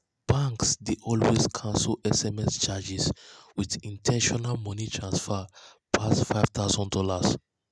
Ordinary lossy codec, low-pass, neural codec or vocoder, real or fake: none; none; none; real